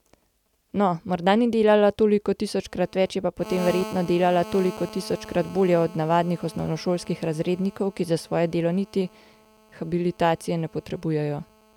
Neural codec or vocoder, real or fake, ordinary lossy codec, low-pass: none; real; none; 19.8 kHz